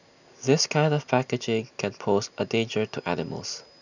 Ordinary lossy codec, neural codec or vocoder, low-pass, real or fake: none; none; 7.2 kHz; real